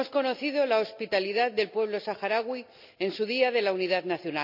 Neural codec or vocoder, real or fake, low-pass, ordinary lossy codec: none; real; 5.4 kHz; none